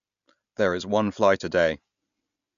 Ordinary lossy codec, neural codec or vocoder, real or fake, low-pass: none; none; real; 7.2 kHz